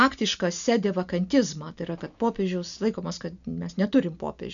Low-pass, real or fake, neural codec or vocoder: 7.2 kHz; real; none